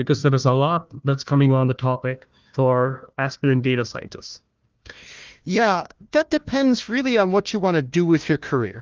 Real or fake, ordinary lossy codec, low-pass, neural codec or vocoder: fake; Opus, 32 kbps; 7.2 kHz; codec, 16 kHz, 1 kbps, FunCodec, trained on Chinese and English, 50 frames a second